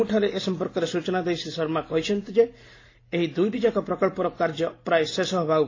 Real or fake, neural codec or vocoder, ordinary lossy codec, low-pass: real; none; AAC, 32 kbps; 7.2 kHz